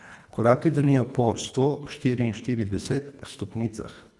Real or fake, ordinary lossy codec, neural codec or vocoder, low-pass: fake; none; codec, 24 kHz, 1.5 kbps, HILCodec; none